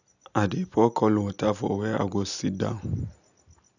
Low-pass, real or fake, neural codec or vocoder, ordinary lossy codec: 7.2 kHz; real; none; none